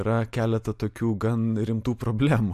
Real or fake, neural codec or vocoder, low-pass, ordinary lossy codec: real; none; 14.4 kHz; MP3, 96 kbps